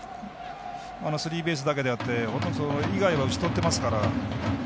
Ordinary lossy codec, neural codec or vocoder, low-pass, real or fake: none; none; none; real